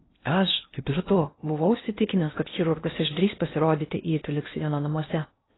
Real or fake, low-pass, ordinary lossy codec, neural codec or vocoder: fake; 7.2 kHz; AAC, 16 kbps; codec, 16 kHz in and 24 kHz out, 0.6 kbps, FocalCodec, streaming, 4096 codes